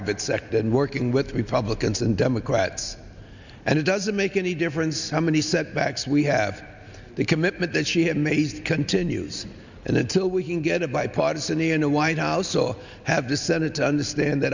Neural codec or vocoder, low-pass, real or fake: none; 7.2 kHz; real